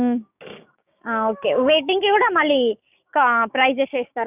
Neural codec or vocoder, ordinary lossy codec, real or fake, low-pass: codec, 16 kHz, 6 kbps, DAC; none; fake; 3.6 kHz